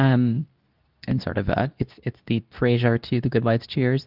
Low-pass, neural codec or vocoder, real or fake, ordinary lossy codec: 5.4 kHz; codec, 24 kHz, 0.9 kbps, WavTokenizer, medium speech release version 2; fake; Opus, 24 kbps